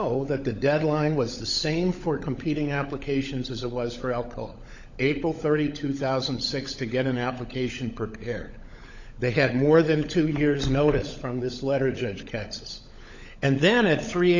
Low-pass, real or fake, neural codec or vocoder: 7.2 kHz; fake; codec, 16 kHz, 16 kbps, FunCodec, trained on LibriTTS, 50 frames a second